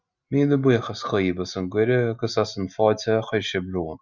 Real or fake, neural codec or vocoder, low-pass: real; none; 7.2 kHz